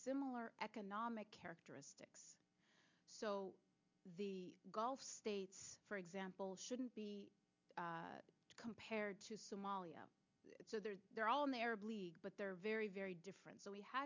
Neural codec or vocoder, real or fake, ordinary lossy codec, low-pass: none; real; MP3, 64 kbps; 7.2 kHz